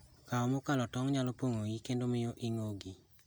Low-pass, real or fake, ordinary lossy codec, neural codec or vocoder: none; real; none; none